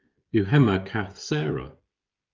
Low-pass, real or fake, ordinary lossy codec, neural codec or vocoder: 7.2 kHz; fake; Opus, 32 kbps; codec, 16 kHz, 16 kbps, FreqCodec, smaller model